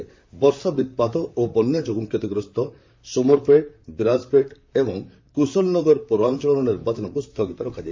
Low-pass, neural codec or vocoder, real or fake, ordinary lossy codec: 7.2 kHz; vocoder, 44.1 kHz, 128 mel bands, Pupu-Vocoder; fake; MP3, 48 kbps